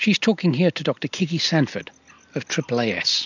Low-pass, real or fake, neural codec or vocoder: 7.2 kHz; real; none